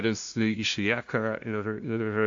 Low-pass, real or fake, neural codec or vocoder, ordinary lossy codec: 7.2 kHz; fake; codec, 16 kHz, 0.5 kbps, FunCodec, trained on LibriTTS, 25 frames a second; AAC, 64 kbps